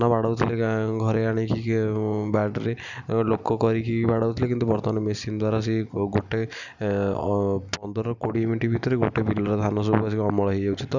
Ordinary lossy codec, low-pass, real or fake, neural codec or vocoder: none; 7.2 kHz; real; none